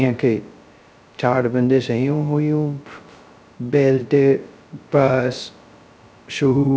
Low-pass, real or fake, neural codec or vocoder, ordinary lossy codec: none; fake; codec, 16 kHz, 0.2 kbps, FocalCodec; none